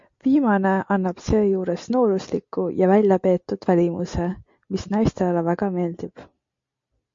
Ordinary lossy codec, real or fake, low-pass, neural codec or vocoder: MP3, 48 kbps; real; 7.2 kHz; none